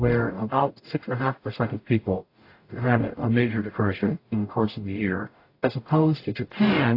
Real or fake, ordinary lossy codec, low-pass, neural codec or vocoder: fake; AAC, 32 kbps; 5.4 kHz; codec, 44.1 kHz, 0.9 kbps, DAC